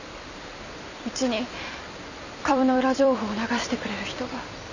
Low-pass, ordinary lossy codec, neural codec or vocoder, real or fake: 7.2 kHz; none; vocoder, 44.1 kHz, 128 mel bands every 256 samples, BigVGAN v2; fake